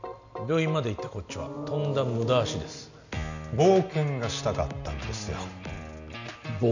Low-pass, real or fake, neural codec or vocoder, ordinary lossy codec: 7.2 kHz; real; none; none